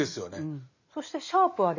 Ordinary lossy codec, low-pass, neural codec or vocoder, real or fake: none; 7.2 kHz; none; real